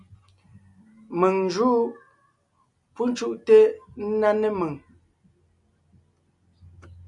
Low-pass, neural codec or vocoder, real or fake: 10.8 kHz; none; real